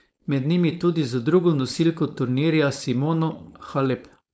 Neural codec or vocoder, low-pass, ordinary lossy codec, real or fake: codec, 16 kHz, 4.8 kbps, FACodec; none; none; fake